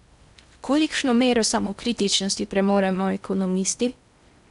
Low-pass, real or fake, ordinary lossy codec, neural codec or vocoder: 10.8 kHz; fake; none; codec, 16 kHz in and 24 kHz out, 0.6 kbps, FocalCodec, streaming, 4096 codes